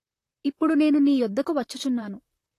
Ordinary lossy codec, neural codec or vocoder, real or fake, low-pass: AAC, 48 kbps; vocoder, 44.1 kHz, 128 mel bands, Pupu-Vocoder; fake; 14.4 kHz